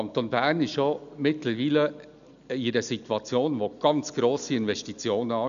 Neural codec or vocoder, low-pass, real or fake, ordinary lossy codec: none; 7.2 kHz; real; none